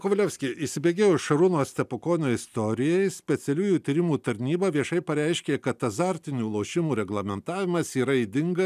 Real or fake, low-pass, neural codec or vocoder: real; 14.4 kHz; none